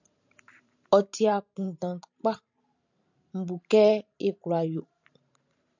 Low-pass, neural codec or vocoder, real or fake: 7.2 kHz; vocoder, 44.1 kHz, 128 mel bands every 512 samples, BigVGAN v2; fake